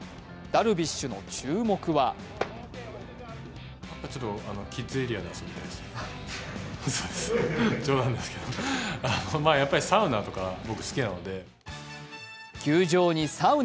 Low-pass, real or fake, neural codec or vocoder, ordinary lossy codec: none; real; none; none